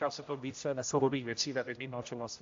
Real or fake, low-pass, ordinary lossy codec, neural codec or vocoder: fake; 7.2 kHz; AAC, 48 kbps; codec, 16 kHz, 0.5 kbps, X-Codec, HuBERT features, trained on general audio